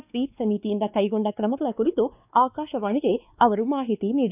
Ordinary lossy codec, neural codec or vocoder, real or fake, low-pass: none; codec, 16 kHz, 2 kbps, X-Codec, WavLM features, trained on Multilingual LibriSpeech; fake; 3.6 kHz